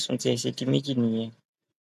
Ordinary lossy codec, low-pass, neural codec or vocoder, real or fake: none; 14.4 kHz; none; real